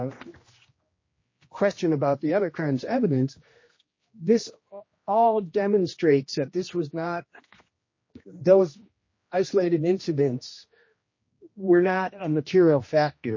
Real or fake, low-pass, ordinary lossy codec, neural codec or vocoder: fake; 7.2 kHz; MP3, 32 kbps; codec, 16 kHz, 1 kbps, X-Codec, HuBERT features, trained on general audio